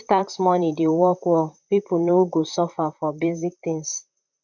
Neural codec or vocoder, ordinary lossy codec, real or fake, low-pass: vocoder, 44.1 kHz, 128 mel bands, Pupu-Vocoder; none; fake; 7.2 kHz